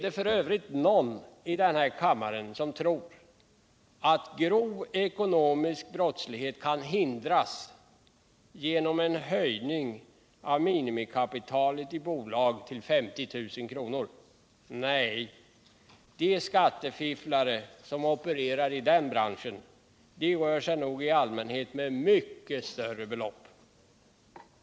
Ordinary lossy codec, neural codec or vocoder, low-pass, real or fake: none; none; none; real